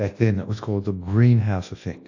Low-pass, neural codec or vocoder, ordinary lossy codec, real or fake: 7.2 kHz; codec, 24 kHz, 0.9 kbps, WavTokenizer, large speech release; AAC, 48 kbps; fake